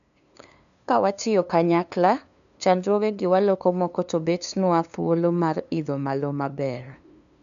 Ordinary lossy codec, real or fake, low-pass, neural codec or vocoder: none; fake; 7.2 kHz; codec, 16 kHz, 2 kbps, FunCodec, trained on LibriTTS, 25 frames a second